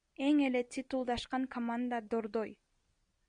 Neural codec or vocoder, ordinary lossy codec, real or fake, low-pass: none; Opus, 64 kbps; real; 9.9 kHz